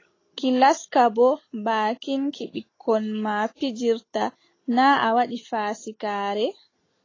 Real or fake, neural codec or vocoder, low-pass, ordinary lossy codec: real; none; 7.2 kHz; AAC, 32 kbps